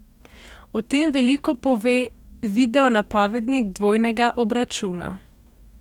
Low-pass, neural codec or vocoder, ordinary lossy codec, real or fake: 19.8 kHz; codec, 44.1 kHz, 2.6 kbps, DAC; none; fake